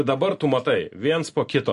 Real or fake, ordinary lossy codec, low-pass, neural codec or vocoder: real; MP3, 48 kbps; 14.4 kHz; none